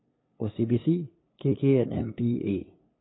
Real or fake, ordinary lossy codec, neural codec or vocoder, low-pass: fake; AAC, 16 kbps; codec, 16 kHz, 2 kbps, FunCodec, trained on LibriTTS, 25 frames a second; 7.2 kHz